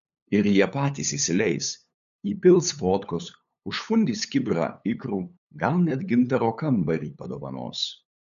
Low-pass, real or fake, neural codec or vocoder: 7.2 kHz; fake; codec, 16 kHz, 8 kbps, FunCodec, trained on LibriTTS, 25 frames a second